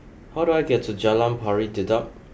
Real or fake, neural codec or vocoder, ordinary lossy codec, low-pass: real; none; none; none